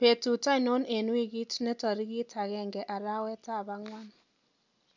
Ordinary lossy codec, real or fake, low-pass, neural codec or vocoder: none; real; 7.2 kHz; none